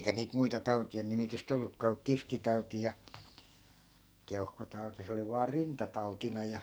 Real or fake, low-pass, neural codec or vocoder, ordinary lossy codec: fake; none; codec, 44.1 kHz, 2.6 kbps, SNAC; none